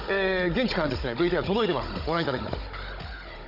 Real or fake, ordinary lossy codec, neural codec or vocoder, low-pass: fake; none; codec, 16 kHz, 16 kbps, FunCodec, trained on Chinese and English, 50 frames a second; 5.4 kHz